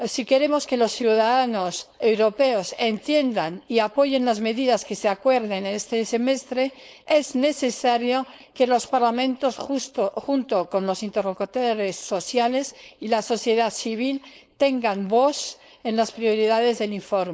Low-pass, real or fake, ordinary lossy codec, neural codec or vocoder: none; fake; none; codec, 16 kHz, 4.8 kbps, FACodec